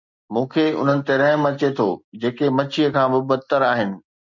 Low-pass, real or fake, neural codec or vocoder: 7.2 kHz; real; none